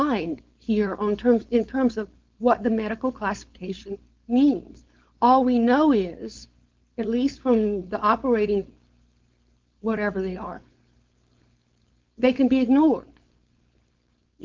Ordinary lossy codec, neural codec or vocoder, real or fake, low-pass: Opus, 32 kbps; codec, 16 kHz, 4.8 kbps, FACodec; fake; 7.2 kHz